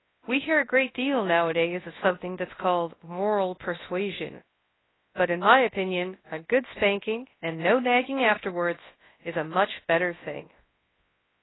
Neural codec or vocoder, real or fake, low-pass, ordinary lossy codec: codec, 24 kHz, 0.9 kbps, WavTokenizer, large speech release; fake; 7.2 kHz; AAC, 16 kbps